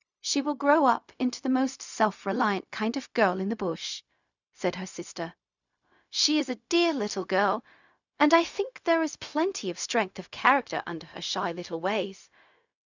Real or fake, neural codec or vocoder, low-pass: fake; codec, 16 kHz, 0.4 kbps, LongCat-Audio-Codec; 7.2 kHz